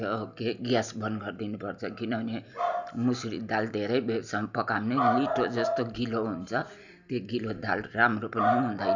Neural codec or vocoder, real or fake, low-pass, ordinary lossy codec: none; real; 7.2 kHz; none